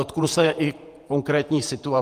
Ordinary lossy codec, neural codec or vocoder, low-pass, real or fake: Opus, 32 kbps; vocoder, 48 kHz, 128 mel bands, Vocos; 14.4 kHz; fake